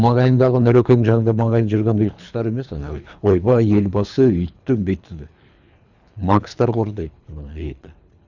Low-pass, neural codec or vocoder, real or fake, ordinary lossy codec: 7.2 kHz; codec, 24 kHz, 3 kbps, HILCodec; fake; none